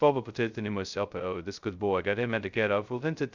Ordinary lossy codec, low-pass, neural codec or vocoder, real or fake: Opus, 64 kbps; 7.2 kHz; codec, 16 kHz, 0.2 kbps, FocalCodec; fake